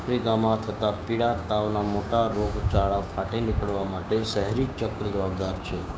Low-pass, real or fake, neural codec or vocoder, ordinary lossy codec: none; fake; codec, 16 kHz, 6 kbps, DAC; none